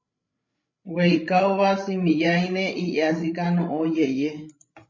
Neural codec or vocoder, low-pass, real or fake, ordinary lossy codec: codec, 16 kHz, 16 kbps, FreqCodec, larger model; 7.2 kHz; fake; MP3, 32 kbps